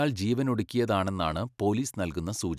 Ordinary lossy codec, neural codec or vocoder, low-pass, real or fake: none; none; 14.4 kHz; real